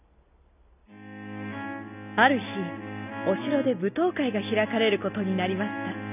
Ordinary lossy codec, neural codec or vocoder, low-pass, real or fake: none; none; 3.6 kHz; real